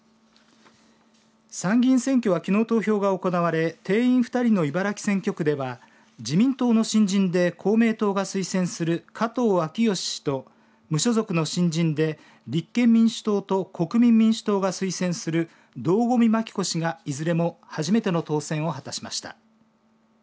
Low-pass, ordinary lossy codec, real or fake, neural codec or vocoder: none; none; real; none